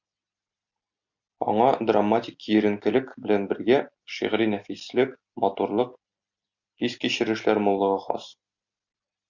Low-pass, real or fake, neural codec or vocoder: 7.2 kHz; real; none